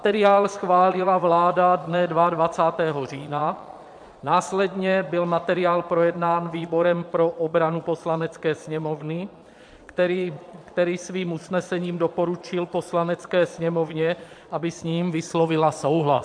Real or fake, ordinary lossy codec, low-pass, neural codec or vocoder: fake; MP3, 64 kbps; 9.9 kHz; vocoder, 22.05 kHz, 80 mel bands, Vocos